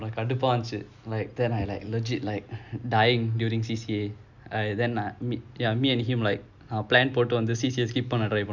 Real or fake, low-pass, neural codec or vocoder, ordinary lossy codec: real; 7.2 kHz; none; none